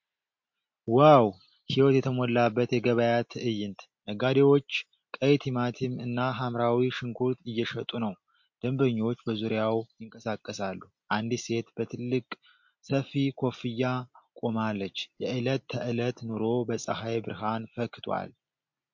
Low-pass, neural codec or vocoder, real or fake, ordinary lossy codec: 7.2 kHz; none; real; MP3, 48 kbps